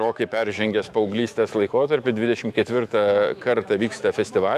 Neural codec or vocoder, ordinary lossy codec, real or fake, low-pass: autoencoder, 48 kHz, 128 numbers a frame, DAC-VAE, trained on Japanese speech; AAC, 96 kbps; fake; 14.4 kHz